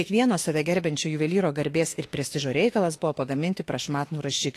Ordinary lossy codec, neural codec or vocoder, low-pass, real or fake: AAC, 48 kbps; autoencoder, 48 kHz, 32 numbers a frame, DAC-VAE, trained on Japanese speech; 14.4 kHz; fake